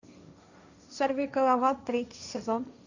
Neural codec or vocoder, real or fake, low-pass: codec, 16 kHz, 1.1 kbps, Voila-Tokenizer; fake; 7.2 kHz